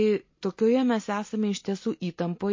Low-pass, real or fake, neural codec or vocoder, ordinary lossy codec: 7.2 kHz; real; none; MP3, 32 kbps